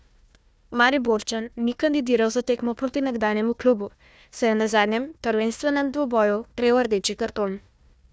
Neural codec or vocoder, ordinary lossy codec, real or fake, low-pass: codec, 16 kHz, 1 kbps, FunCodec, trained on Chinese and English, 50 frames a second; none; fake; none